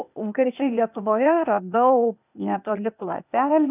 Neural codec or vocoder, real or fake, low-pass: codec, 16 kHz, 0.8 kbps, ZipCodec; fake; 3.6 kHz